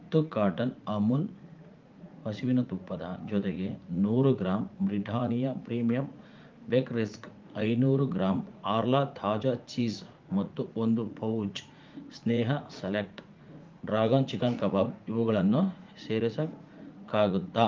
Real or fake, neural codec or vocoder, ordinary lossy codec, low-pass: fake; vocoder, 44.1 kHz, 80 mel bands, Vocos; Opus, 32 kbps; 7.2 kHz